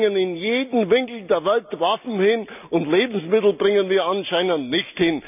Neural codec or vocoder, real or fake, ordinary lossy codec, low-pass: none; real; none; 3.6 kHz